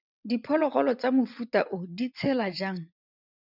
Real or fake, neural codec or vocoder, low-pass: fake; vocoder, 22.05 kHz, 80 mel bands, WaveNeXt; 5.4 kHz